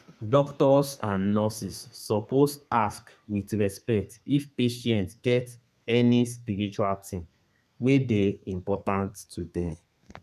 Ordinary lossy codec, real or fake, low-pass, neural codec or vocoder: none; fake; 14.4 kHz; codec, 32 kHz, 1.9 kbps, SNAC